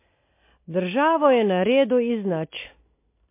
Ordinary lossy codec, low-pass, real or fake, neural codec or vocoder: MP3, 24 kbps; 3.6 kHz; real; none